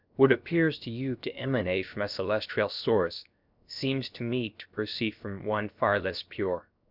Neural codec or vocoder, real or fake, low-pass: codec, 16 kHz, 0.7 kbps, FocalCodec; fake; 5.4 kHz